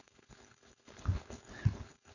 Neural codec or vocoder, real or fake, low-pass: codec, 16 kHz, 4.8 kbps, FACodec; fake; 7.2 kHz